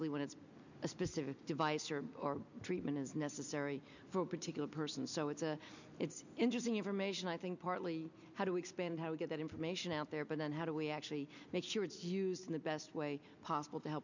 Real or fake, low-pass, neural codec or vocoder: real; 7.2 kHz; none